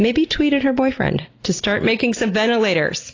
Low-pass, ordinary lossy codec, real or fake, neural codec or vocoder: 7.2 kHz; AAC, 32 kbps; real; none